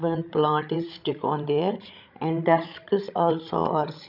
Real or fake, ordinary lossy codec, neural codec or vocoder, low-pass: fake; none; codec, 16 kHz, 16 kbps, FreqCodec, larger model; 5.4 kHz